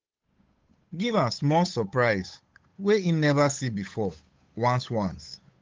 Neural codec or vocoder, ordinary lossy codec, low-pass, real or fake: codec, 16 kHz, 8 kbps, FunCodec, trained on Chinese and English, 25 frames a second; Opus, 32 kbps; 7.2 kHz; fake